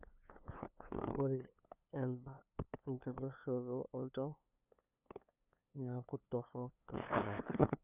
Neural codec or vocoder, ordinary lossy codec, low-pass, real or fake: codec, 16 kHz, 2 kbps, FunCodec, trained on LibriTTS, 25 frames a second; none; 3.6 kHz; fake